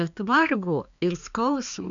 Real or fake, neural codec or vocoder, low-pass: fake; codec, 16 kHz, 2 kbps, X-Codec, HuBERT features, trained on balanced general audio; 7.2 kHz